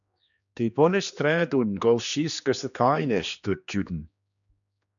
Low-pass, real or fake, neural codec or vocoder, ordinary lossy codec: 7.2 kHz; fake; codec, 16 kHz, 2 kbps, X-Codec, HuBERT features, trained on general audio; MP3, 96 kbps